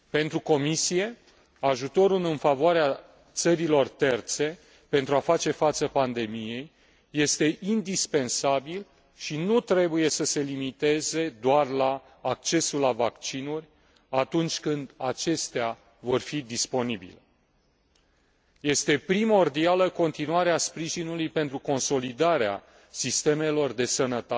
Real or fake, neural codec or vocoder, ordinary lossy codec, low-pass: real; none; none; none